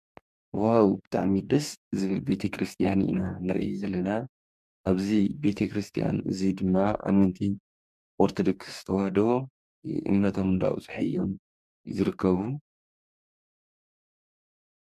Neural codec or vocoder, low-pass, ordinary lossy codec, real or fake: codec, 44.1 kHz, 2.6 kbps, DAC; 14.4 kHz; MP3, 96 kbps; fake